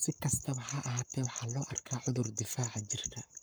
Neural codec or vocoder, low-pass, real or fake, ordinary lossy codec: codec, 44.1 kHz, 7.8 kbps, Pupu-Codec; none; fake; none